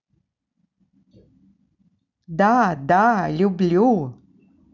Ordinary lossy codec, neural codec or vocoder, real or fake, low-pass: none; none; real; 7.2 kHz